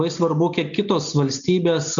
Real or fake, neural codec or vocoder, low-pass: real; none; 7.2 kHz